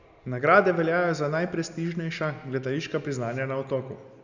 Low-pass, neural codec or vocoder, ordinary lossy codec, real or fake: 7.2 kHz; none; none; real